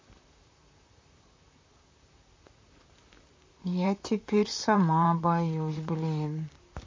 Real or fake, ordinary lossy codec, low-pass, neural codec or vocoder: fake; MP3, 32 kbps; 7.2 kHz; vocoder, 44.1 kHz, 128 mel bands, Pupu-Vocoder